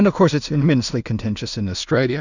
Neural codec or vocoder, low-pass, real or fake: codec, 16 kHz in and 24 kHz out, 0.4 kbps, LongCat-Audio-Codec, two codebook decoder; 7.2 kHz; fake